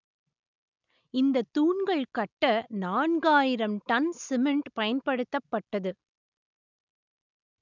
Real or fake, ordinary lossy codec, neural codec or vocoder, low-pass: real; none; none; 7.2 kHz